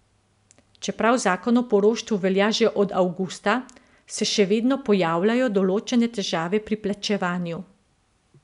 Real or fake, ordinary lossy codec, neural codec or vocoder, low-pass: real; none; none; 10.8 kHz